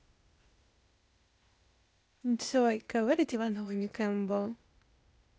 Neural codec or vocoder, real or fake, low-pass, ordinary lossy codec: codec, 16 kHz, 0.8 kbps, ZipCodec; fake; none; none